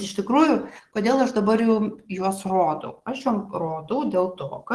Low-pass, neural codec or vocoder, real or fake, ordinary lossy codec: 10.8 kHz; none; real; Opus, 16 kbps